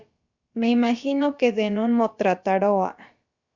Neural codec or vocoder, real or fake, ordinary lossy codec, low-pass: codec, 16 kHz, about 1 kbps, DyCAST, with the encoder's durations; fake; Opus, 64 kbps; 7.2 kHz